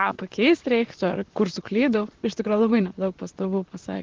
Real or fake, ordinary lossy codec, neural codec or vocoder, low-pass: fake; Opus, 16 kbps; vocoder, 44.1 kHz, 128 mel bands, Pupu-Vocoder; 7.2 kHz